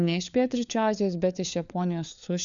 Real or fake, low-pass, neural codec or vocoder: fake; 7.2 kHz; codec, 16 kHz, 4 kbps, FunCodec, trained on LibriTTS, 50 frames a second